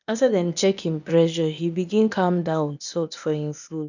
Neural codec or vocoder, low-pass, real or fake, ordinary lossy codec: codec, 16 kHz, 0.8 kbps, ZipCodec; 7.2 kHz; fake; none